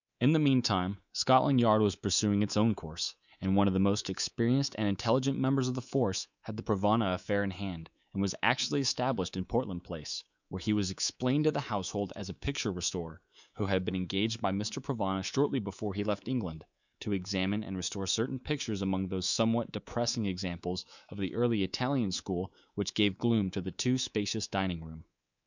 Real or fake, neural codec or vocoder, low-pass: fake; codec, 24 kHz, 3.1 kbps, DualCodec; 7.2 kHz